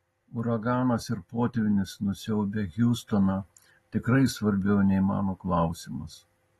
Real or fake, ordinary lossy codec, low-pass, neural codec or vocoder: real; AAC, 32 kbps; 19.8 kHz; none